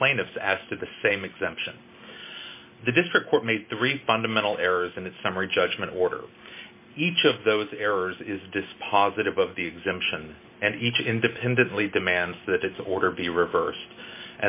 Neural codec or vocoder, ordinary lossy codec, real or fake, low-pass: none; MP3, 24 kbps; real; 3.6 kHz